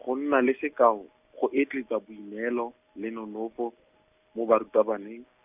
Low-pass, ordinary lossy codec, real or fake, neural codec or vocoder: 3.6 kHz; none; real; none